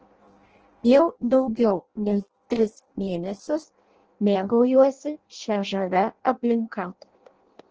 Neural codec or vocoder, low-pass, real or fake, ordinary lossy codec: codec, 16 kHz in and 24 kHz out, 0.6 kbps, FireRedTTS-2 codec; 7.2 kHz; fake; Opus, 16 kbps